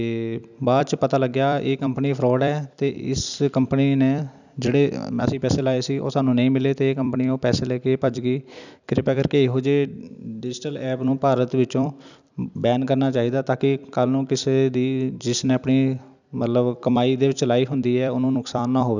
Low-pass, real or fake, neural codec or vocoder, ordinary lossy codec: 7.2 kHz; fake; vocoder, 44.1 kHz, 128 mel bands every 256 samples, BigVGAN v2; none